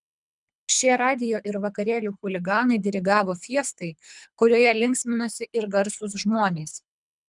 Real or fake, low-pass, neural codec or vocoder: fake; 10.8 kHz; codec, 24 kHz, 3 kbps, HILCodec